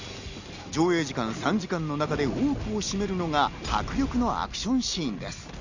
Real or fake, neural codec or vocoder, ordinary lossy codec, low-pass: real; none; Opus, 64 kbps; 7.2 kHz